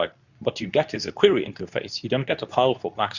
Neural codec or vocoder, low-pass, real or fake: codec, 24 kHz, 0.9 kbps, WavTokenizer, medium speech release version 2; 7.2 kHz; fake